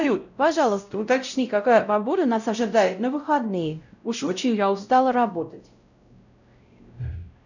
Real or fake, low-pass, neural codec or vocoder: fake; 7.2 kHz; codec, 16 kHz, 0.5 kbps, X-Codec, WavLM features, trained on Multilingual LibriSpeech